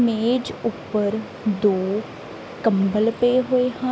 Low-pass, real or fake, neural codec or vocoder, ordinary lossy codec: none; real; none; none